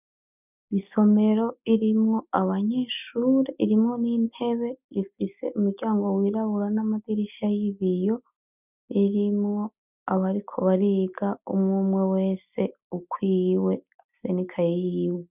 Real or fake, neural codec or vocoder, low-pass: real; none; 3.6 kHz